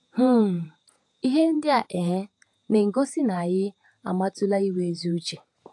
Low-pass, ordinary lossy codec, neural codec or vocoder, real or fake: 10.8 kHz; AAC, 64 kbps; vocoder, 48 kHz, 128 mel bands, Vocos; fake